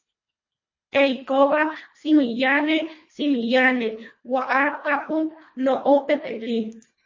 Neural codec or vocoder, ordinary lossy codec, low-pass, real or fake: codec, 24 kHz, 1.5 kbps, HILCodec; MP3, 32 kbps; 7.2 kHz; fake